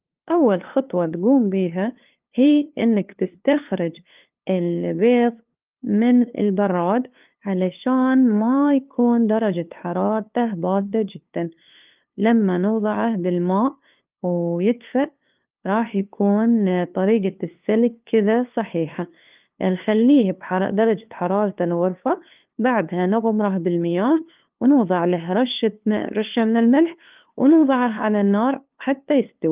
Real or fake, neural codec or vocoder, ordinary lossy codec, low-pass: fake; codec, 16 kHz, 2 kbps, FunCodec, trained on LibriTTS, 25 frames a second; Opus, 24 kbps; 3.6 kHz